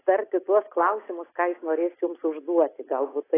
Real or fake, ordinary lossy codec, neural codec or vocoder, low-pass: real; AAC, 16 kbps; none; 3.6 kHz